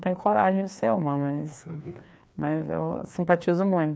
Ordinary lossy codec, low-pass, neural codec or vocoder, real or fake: none; none; codec, 16 kHz, 2 kbps, FreqCodec, larger model; fake